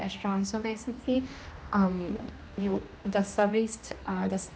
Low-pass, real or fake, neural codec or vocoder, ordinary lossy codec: none; fake; codec, 16 kHz, 1 kbps, X-Codec, HuBERT features, trained on general audio; none